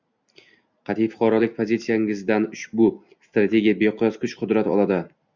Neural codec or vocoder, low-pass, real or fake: none; 7.2 kHz; real